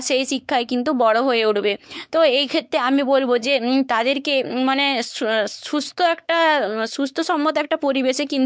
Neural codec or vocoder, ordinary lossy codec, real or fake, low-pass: codec, 16 kHz, 4 kbps, X-Codec, WavLM features, trained on Multilingual LibriSpeech; none; fake; none